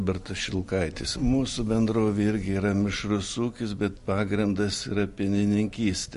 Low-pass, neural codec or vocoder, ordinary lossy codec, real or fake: 14.4 kHz; none; MP3, 48 kbps; real